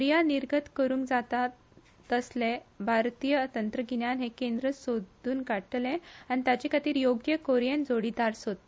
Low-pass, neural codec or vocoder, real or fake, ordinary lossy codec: none; none; real; none